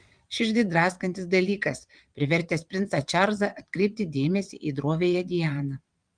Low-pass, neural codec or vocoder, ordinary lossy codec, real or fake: 9.9 kHz; vocoder, 22.05 kHz, 80 mel bands, WaveNeXt; Opus, 32 kbps; fake